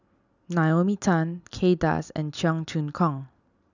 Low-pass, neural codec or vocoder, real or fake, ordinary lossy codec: 7.2 kHz; none; real; none